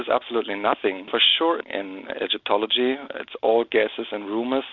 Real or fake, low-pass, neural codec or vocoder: real; 7.2 kHz; none